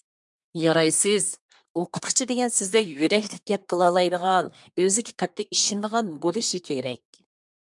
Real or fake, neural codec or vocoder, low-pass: fake; codec, 24 kHz, 1 kbps, SNAC; 10.8 kHz